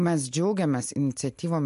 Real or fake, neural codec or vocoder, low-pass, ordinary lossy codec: real; none; 10.8 kHz; MP3, 64 kbps